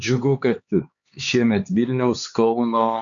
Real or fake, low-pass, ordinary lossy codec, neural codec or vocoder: fake; 7.2 kHz; MP3, 96 kbps; codec, 16 kHz, 4 kbps, X-Codec, HuBERT features, trained on LibriSpeech